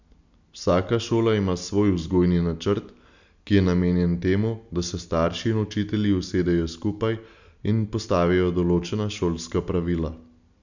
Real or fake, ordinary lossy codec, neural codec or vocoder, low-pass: real; none; none; 7.2 kHz